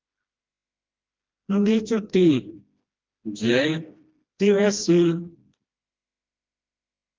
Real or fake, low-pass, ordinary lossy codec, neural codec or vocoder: fake; 7.2 kHz; Opus, 24 kbps; codec, 16 kHz, 1 kbps, FreqCodec, smaller model